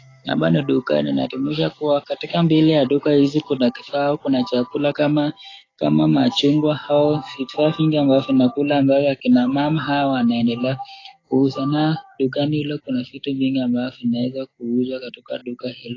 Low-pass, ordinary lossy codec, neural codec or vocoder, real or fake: 7.2 kHz; AAC, 32 kbps; codec, 44.1 kHz, 7.8 kbps, DAC; fake